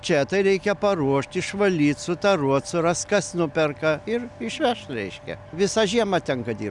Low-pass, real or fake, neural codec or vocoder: 10.8 kHz; real; none